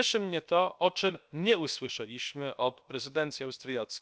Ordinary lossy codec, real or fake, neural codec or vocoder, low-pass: none; fake; codec, 16 kHz, about 1 kbps, DyCAST, with the encoder's durations; none